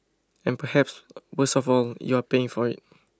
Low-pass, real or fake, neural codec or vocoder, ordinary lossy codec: none; real; none; none